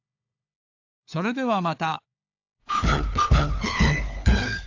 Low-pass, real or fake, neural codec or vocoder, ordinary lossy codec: 7.2 kHz; fake; codec, 16 kHz, 4 kbps, FunCodec, trained on LibriTTS, 50 frames a second; AAC, 48 kbps